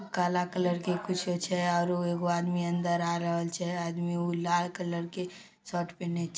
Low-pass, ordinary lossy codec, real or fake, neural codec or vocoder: none; none; real; none